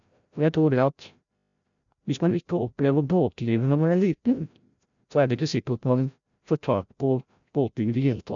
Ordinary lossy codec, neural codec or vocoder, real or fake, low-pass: none; codec, 16 kHz, 0.5 kbps, FreqCodec, larger model; fake; 7.2 kHz